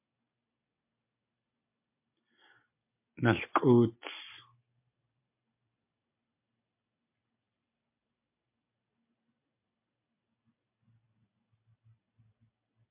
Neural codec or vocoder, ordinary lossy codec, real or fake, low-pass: none; MP3, 24 kbps; real; 3.6 kHz